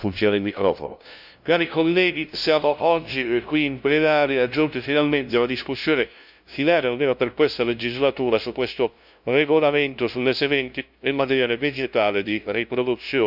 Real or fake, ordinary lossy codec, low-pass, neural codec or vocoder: fake; none; 5.4 kHz; codec, 16 kHz, 0.5 kbps, FunCodec, trained on LibriTTS, 25 frames a second